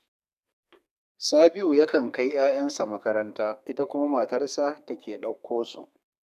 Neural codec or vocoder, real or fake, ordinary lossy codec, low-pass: codec, 32 kHz, 1.9 kbps, SNAC; fake; none; 14.4 kHz